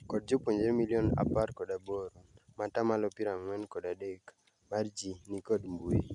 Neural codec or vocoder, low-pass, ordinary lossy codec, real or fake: none; 10.8 kHz; none; real